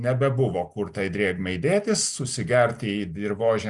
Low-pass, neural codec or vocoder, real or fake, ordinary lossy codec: 10.8 kHz; none; real; Opus, 64 kbps